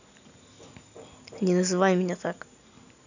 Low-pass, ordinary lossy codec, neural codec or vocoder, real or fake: 7.2 kHz; none; none; real